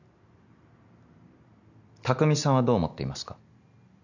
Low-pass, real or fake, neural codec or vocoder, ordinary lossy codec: 7.2 kHz; real; none; none